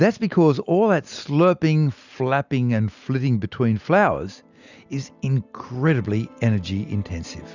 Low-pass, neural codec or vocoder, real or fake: 7.2 kHz; none; real